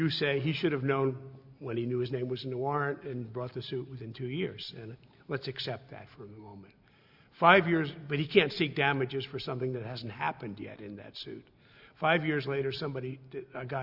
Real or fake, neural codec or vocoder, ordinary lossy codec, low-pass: real; none; Opus, 64 kbps; 5.4 kHz